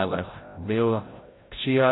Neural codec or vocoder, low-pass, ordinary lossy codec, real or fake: codec, 16 kHz, 0.5 kbps, FreqCodec, larger model; 7.2 kHz; AAC, 16 kbps; fake